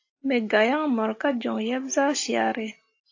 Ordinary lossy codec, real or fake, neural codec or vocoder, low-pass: AAC, 48 kbps; real; none; 7.2 kHz